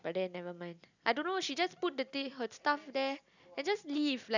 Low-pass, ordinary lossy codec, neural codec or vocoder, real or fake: 7.2 kHz; none; none; real